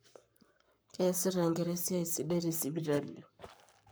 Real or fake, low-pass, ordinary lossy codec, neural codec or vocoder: fake; none; none; codec, 44.1 kHz, 7.8 kbps, Pupu-Codec